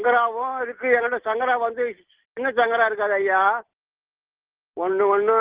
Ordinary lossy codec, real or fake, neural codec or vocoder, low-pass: Opus, 16 kbps; real; none; 3.6 kHz